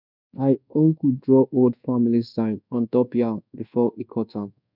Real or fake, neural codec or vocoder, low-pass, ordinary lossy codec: fake; codec, 24 kHz, 1.2 kbps, DualCodec; 5.4 kHz; none